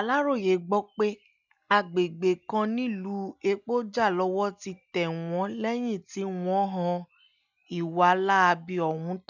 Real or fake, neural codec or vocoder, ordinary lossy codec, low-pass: real; none; none; 7.2 kHz